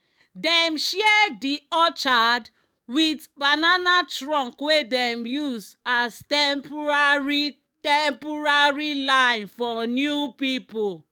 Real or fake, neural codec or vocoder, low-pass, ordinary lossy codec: fake; codec, 44.1 kHz, 7.8 kbps, DAC; 19.8 kHz; none